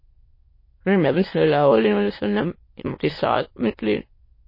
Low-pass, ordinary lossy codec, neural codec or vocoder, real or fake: 5.4 kHz; MP3, 24 kbps; autoencoder, 22.05 kHz, a latent of 192 numbers a frame, VITS, trained on many speakers; fake